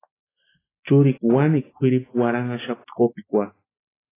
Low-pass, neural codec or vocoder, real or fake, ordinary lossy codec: 3.6 kHz; none; real; AAC, 16 kbps